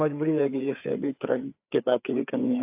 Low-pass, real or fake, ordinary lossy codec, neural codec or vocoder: 3.6 kHz; fake; AAC, 32 kbps; codec, 16 kHz, 4 kbps, FreqCodec, larger model